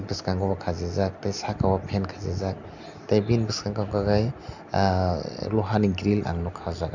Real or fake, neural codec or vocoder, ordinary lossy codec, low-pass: real; none; none; 7.2 kHz